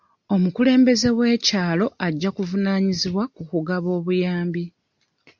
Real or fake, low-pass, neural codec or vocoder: real; 7.2 kHz; none